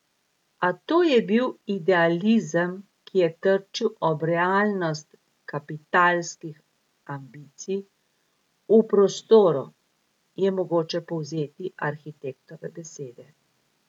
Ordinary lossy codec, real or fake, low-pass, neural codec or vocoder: none; real; 19.8 kHz; none